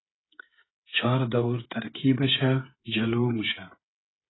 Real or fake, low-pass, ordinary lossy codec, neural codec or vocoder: fake; 7.2 kHz; AAC, 16 kbps; codec, 16 kHz, 16 kbps, FreqCodec, smaller model